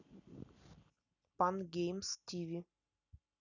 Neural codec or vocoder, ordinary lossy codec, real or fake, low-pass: none; none; real; 7.2 kHz